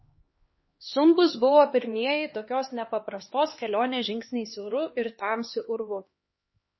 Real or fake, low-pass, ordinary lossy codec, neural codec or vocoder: fake; 7.2 kHz; MP3, 24 kbps; codec, 16 kHz, 2 kbps, X-Codec, HuBERT features, trained on LibriSpeech